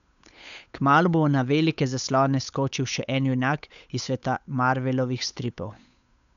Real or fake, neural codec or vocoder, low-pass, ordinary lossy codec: fake; codec, 16 kHz, 8 kbps, FunCodec, trained on Chinese and English, 25 frames a second; 7.2 kHz; none